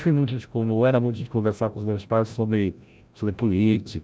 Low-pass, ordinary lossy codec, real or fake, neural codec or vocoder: none; none; fake; codec, 16 kHz, 0.5 kbps, FreqCodec, larger model